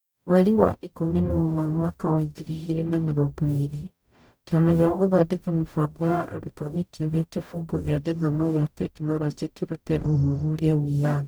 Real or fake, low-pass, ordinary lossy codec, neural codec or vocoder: fake; none; none; codec, 44.1 kHz, 0.9 kbps, DAC